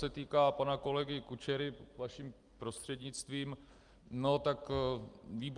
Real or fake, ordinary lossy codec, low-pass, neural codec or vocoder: real; Opus, 32 kbps; 10.8 kHz; none